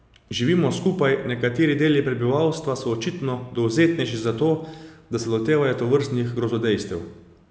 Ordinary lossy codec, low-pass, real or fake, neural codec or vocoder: none; none; real; none